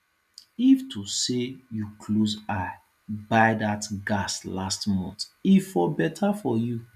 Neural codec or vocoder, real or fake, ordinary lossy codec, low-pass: none; real; none; 14.4 kHz